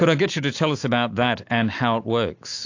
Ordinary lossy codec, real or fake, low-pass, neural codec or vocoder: AAC, 48 kbps; fake; 7.2 kHz; autoencoder, 48 kHz, 128 numbers a frame, DAC-VAE, trained on Japanese speech